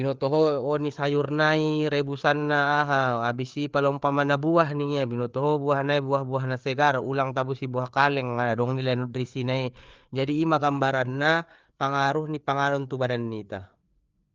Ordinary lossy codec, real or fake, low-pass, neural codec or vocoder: Opus, 32 kbps; fake; 7.2 kHz; codec, 16 kHz, 4 kbps, FreqCodec, larger model